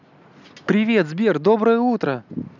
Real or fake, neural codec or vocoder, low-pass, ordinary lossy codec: real; none; 7.2 kHz; none